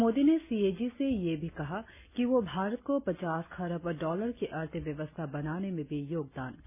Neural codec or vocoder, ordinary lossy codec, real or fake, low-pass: none; none; real; 3.6 kHz